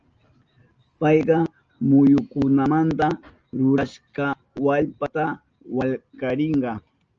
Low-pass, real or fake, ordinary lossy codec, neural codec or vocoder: 7.2 kHz; real; Opus, 24 kbps; none